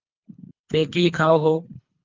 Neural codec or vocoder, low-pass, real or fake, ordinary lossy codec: codec, 24 kHz, 3 kbps, HILCodec; 7.2 kHz; fake; Opus, 24 kbps